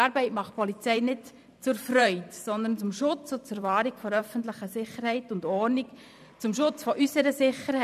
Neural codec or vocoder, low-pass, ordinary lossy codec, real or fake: vocoder, 44.1 kHz, 128 mel bands every 512 samples, BigVGAN v2; 14.4 kHz; none; fake